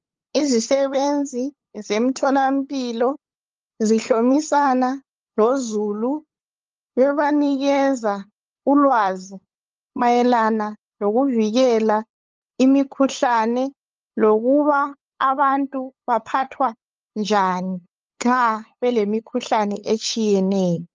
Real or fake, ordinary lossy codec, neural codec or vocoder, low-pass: fake; Opus, 24 kbps; codec, 16 kHz, 8 kbps, FunCodec, trained on LibriTTS, 25 frames a second; 7.2 kHz